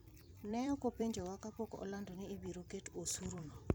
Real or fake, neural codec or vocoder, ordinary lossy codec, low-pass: fake; vocoder, 44.1 kHz, 128 mel bands every 256 samples, BigVGAN v2; none; none